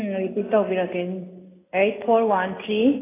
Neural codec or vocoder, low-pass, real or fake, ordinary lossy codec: none; 3.6 kHz; real; AAC, 16 kbps